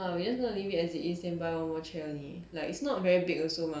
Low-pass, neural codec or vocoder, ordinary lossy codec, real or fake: none; none; none; real